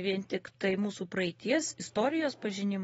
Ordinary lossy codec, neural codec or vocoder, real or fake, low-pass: AAC, 24 kbps; none; real; 19.8 kHz